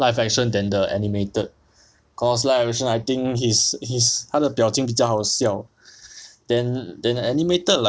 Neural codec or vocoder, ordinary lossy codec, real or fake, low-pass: none; none; real; none